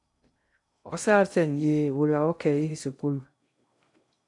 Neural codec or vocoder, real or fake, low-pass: codec, 16 kHz in and 24 kHz out, 0.6 kbps, FocalCodec, streaming, 2048 codes; fake; 10.8 kHz